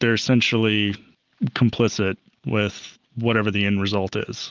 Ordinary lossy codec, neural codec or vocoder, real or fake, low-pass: Opus, 32 kbps; none; real; 7.2 kHz